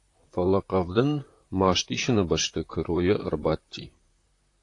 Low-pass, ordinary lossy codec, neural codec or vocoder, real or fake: 10.8 kHz; AAC, 48 kbps; vocoder, 44.1 kHz, 128 mel bands, Pupu-Vocoder; fake